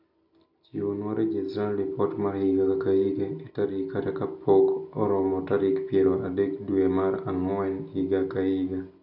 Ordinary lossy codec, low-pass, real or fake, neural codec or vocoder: none; 5.4 kHz; real; none